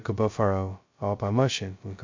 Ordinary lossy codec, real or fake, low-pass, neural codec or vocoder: MP3, 64 kbps; fake; 7.2 kHz; codec, 16 kHz, 0.2 kbps, FocalCodec